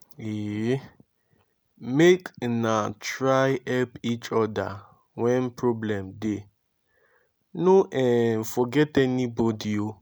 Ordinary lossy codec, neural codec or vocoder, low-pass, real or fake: none; none; none; real